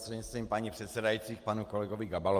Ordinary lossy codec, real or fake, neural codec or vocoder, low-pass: Opus, 32 kbps; real; none; 14.4 kHz